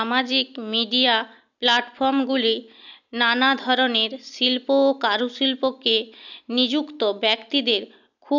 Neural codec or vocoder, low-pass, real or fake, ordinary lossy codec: none; 7.2 kHz; real; none